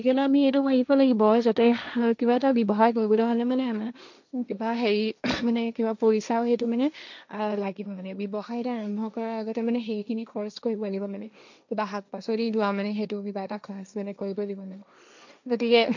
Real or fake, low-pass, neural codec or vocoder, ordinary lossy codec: fake; 7.2 kHz; codec, 16 kHz, 1.1 kbps, Voila-Tokenizer; none